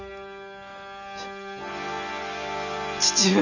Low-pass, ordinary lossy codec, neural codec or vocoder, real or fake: 7.2 kHz; none; none; real